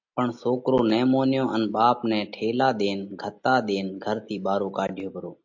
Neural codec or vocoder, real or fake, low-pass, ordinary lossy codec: none; real; 7.2 kHz; MP3, 48 kbps